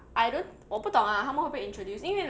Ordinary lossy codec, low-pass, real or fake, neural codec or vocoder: none; none; real; none